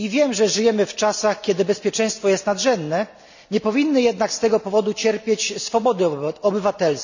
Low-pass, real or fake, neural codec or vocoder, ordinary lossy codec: 7.2 kHz; real; none; none